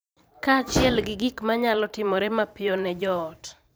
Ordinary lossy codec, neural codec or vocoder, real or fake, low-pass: none; vocoder, 44.1 kHz, 128 mel bands every 512 samples, BigVGAN v2; fake; none